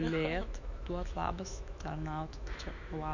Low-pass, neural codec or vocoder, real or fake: 7.2 kHz; autoencoder, 48 kHz, 128 numbers a frame, DAC-VAE, trained on Japanese speech; fake